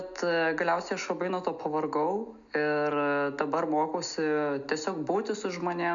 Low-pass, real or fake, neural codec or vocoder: 7.2 kHz; real; none